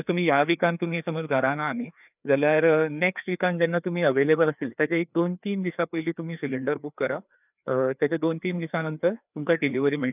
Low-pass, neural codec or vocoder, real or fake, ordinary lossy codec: 3.6 kHz; codec, 16 kHz, 2 kbps, FreqCodec, larger model; fake; none